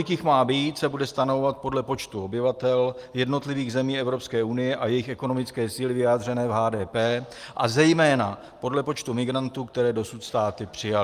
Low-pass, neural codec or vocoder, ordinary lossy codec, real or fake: 14.4 kHz; none; Opus, 32 kbps; real